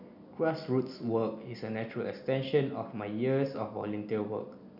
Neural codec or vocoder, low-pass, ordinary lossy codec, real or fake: none; 5.4 kHz; none; real